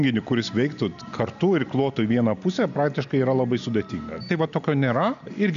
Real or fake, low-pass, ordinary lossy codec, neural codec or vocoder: real; 7.2 kHz; AAC, 96 kbps; none